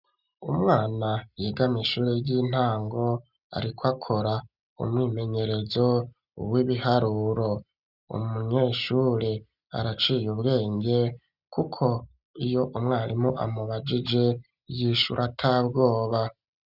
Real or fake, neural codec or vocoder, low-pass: real; none; 5.4 kHz